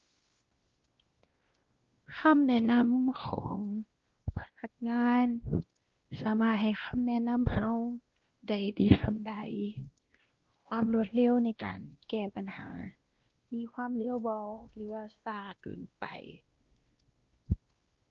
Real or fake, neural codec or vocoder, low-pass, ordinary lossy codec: fake; codec, 16 kHz, 1 kbps, X-Codec, WavLM features, trained on Multilingual LibriSpeech; 7.2 kHz; Opus, 16 kbps